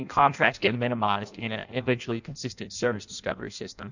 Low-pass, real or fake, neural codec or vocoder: 7.2 kHz; fake; codec, 16 kHz in and 24 kHz out, 0.6 kbps, FireRedTTS-2 codec